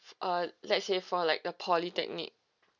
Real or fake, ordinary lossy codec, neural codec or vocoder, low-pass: real; none; none; 7.2 kHz